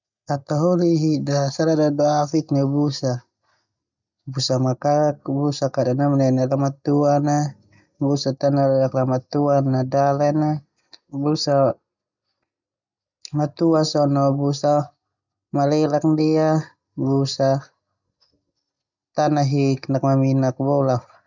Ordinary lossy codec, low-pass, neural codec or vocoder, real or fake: none; 7.2 kHz; none; real